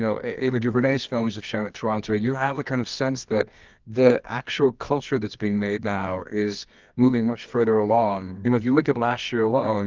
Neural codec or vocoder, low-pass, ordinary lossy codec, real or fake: codec, 24 kHz, 0.9 kbps, WavTokenizer, medium music audio release; 7.2 kHz; Opus, 24 kbps; fake